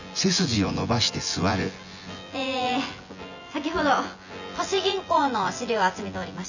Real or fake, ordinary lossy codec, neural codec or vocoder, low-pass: fake; none; vocoder, 24 kHz, 100 mel bands, Vocos; 7.2 kHz